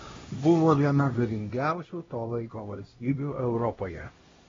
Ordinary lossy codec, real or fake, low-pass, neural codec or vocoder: AAC, 24 kbps; fake; 7.2 kHz; codec, 16 kHz, 1 kbps, X-Codec, HuBERT features, trained on LibriSpeech